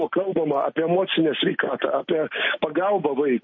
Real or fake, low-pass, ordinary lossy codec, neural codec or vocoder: real; 7.2 kHz; MP3, 32 kbps; none